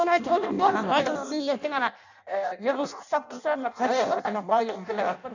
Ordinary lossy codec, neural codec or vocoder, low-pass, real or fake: none; codec, 16 kHz in and 24 kHz out, 0.6 kbps, FireRedTTS-2 codec; 7.2 kHz; fake